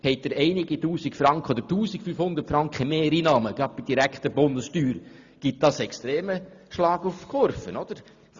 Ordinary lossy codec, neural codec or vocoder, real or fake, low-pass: Opus, 64 kbps; none; real; 7.2 kHz